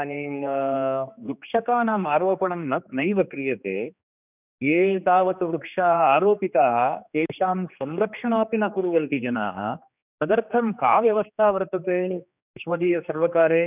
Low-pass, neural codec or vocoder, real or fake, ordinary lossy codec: 3.6 kHz; codec, 16 kHz, 2 kbps, X-Codec, HuBERT features, trained on general audio; fake; none